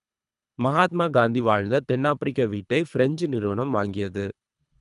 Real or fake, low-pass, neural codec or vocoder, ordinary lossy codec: fake; 10.8 kHz; codec, 24 kHz, 3 kbps, HILCodec; none